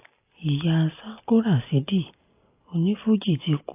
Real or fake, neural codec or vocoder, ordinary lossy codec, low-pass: real; none; AAC, 24 kbps; 3.6 kHz